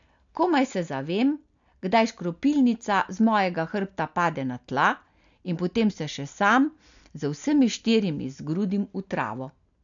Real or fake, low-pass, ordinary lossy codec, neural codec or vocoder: real; 7.2 kHz; none; none